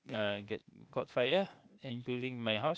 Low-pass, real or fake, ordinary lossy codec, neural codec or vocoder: none; fake; none; codec, 16 kHz, 0.8 kbps, ZipCodec